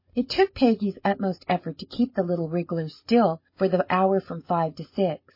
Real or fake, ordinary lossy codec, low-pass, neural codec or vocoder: real; MP3, 24 kbps; 5.4 kHz; none